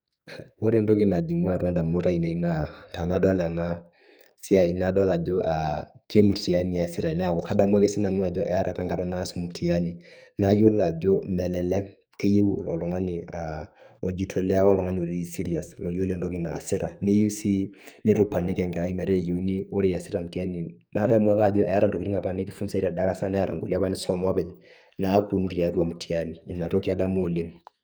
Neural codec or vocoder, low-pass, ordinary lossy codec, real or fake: codec, 44.1 kHz, 2.6 kbps, SNAC; none; none; fake